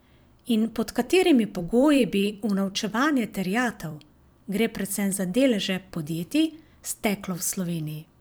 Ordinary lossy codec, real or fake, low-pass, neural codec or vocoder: none; fake; none; vocoder, 44.1 kHz, 128 mel bands every 256 samples, BigVGAN v2